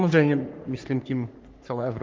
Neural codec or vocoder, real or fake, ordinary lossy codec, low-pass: codec, 44.1 kHz, 3.4 kbps, Pupu-Codec; fake; Opus, 24 kbps; 7.2 kHz